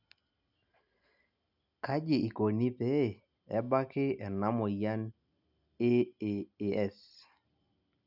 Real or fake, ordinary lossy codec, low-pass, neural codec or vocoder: real; none; 5.4 kHz; none